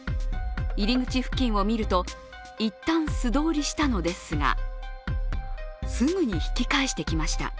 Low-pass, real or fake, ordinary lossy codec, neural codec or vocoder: none; real; none; none